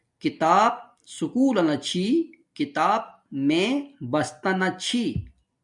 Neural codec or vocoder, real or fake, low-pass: none; real; 10.8 kHz